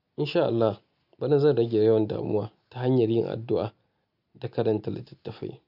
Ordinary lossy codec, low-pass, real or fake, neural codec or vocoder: none; 5.4 kHz; real; none